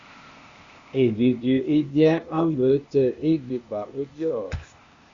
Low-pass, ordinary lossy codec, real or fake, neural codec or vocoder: 7.2 kHz; Opus, 64 kbps; fake; codec, 16 kHz, 0.8 kbps, ZipCodec